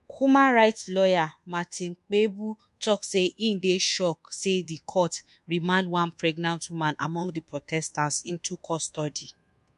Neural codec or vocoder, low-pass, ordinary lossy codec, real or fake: codec, 24 kHz, 1.2 kbps, DualCodec; 10.8 kHz; MP3, 64 kbps; fake